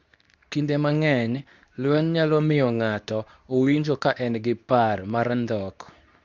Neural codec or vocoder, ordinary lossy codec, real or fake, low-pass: codec, 24 kHz, 0.9 kbps, WavTokenizer, medium speech release version 2; Opus, 64 kbps; fake; 7.2 kHz